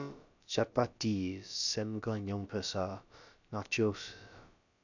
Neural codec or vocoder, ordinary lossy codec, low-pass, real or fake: codec, 16 kHz, about 1 kbps, DyCAST, with the encoder's durations; none; 7.2 kHz; fake